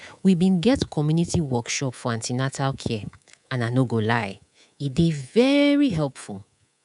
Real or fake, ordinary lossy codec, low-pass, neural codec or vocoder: fake; none; 10.8 kHz; codec, 24 kHz, 3.1 kbps, DualCodec